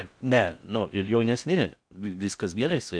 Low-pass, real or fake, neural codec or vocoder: 9.9 kHz; fake; codec, 16 kHz in and 24 kHz out, 0.6 kbps, FocalCodec, streaming, 2048 codes